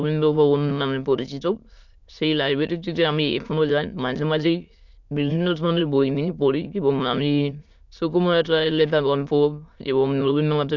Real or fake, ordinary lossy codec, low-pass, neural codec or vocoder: fake; MP3, 64 kbps; 7.2 kHz; autoencoder, 22.05 kHz, a latent of 192 numbers a frame, VITS, trained on many speakers